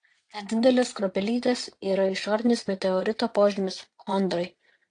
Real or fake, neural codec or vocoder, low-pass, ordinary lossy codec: fake; vocoder, 22.05 kHz, 80 mel bands, Vocos; 9.9 kHz; AAC, 48 kbps